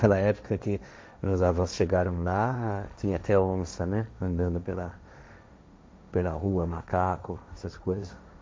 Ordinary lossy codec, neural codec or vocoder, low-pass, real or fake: none; codec, 16 kHz, 1.1 kbps, Voila-Tokenizer; none; fake